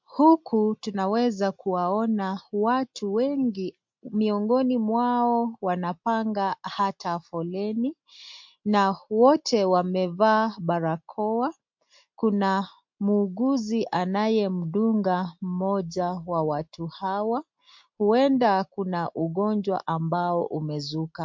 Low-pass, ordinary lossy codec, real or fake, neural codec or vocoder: 7.2 kHz; MP3, 48 kbps; real; none